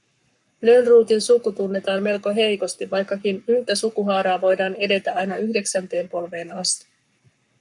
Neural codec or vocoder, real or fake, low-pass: codec, 44.1 kHz, 7.8 kbps, Pupu-Codec; fake; 10.8 kHz